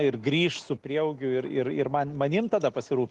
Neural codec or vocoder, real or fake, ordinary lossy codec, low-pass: none; real; Opus, 24 kbps; 9.9 kHz